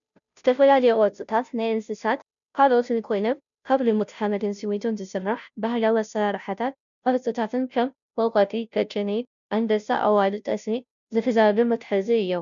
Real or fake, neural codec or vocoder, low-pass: fake; codec, 16 kHz, 0.5 kbps, FunCodec, trained on Chinese and English, 25 frames a second; 7.2 kHz